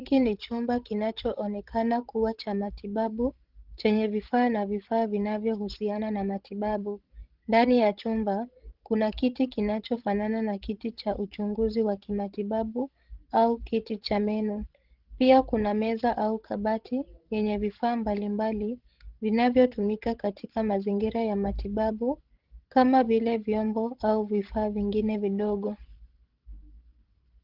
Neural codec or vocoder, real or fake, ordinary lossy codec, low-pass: codec, 16 kHz, 16 kbps, FreqCodec, larger model; fake; Opus, 16 kbps; 5.4 kHz